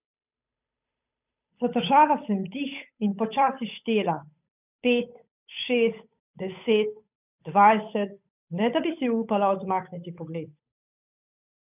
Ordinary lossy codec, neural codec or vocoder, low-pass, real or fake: none; codec, 16 kHz, 8 kbps, FunCodec, trained on Chinese and English, 25 frames a second; 3.6 kHz; fake